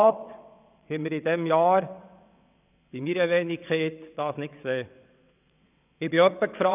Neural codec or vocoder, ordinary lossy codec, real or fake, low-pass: vocoder, 22.05 kHz, 80 mel bands, WaveNeXt; none; fake; 3.6 kHz